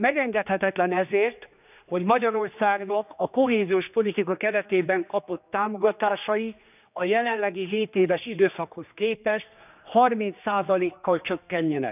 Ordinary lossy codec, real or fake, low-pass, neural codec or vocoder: none; fake; 3.6 kHz; codec, 16 kHz, 2 kbps, X-Codec, HuBERT features, trained on general audio